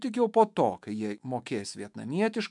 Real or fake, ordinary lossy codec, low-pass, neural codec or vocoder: fake; MP3, 96 kbps; 10.8 kHz; autoencoder, 48 kHz, 128 numbers a frame, DAC-VAE, trained on Japanese speech